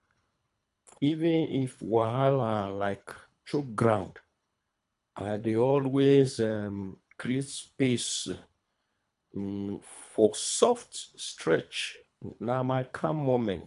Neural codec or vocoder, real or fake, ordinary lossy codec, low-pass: codec, 24 kHz, 3 kbps, HILCodec; fake; AAC, 96 kbps; 10.8 kHz